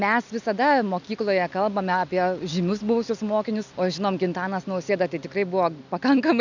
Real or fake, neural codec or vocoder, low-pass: real; none; 7.2 kHz